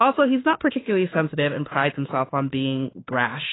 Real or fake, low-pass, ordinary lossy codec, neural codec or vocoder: fake; 7.2 kHz; AAC, 16 kbps; autoencoder, 48 kHz, 32 numbers a frame, DAC-VAE, trained on Japanese speech